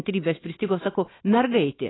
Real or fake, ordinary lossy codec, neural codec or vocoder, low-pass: real; AAC, 16 kbps; none; 7.2 kHz